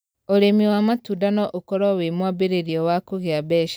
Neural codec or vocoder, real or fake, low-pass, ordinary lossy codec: none; real; none; none